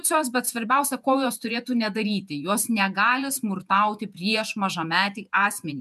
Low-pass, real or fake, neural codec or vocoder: 14.4 kHz; fake; vocoder, 48 kHz, 128 mel bands, Vocos